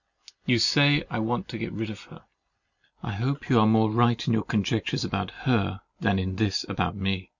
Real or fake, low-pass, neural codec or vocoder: real; 7.2 kHz; none